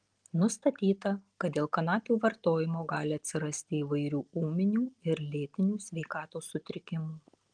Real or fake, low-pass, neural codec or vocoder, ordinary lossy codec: real; 9.9 kHz; none; Opus, 24 kbps